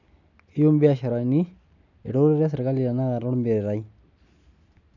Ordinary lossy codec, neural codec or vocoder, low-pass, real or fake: none; none; 7.2 kHz; real